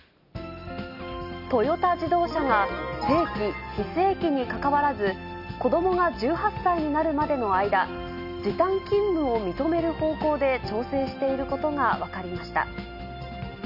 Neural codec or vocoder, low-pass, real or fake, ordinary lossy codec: none; 5.4 kHz; real; none